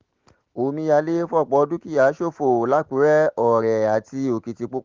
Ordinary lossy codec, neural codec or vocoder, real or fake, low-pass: Opus, 16 kbps; none; real; 7.2 kHz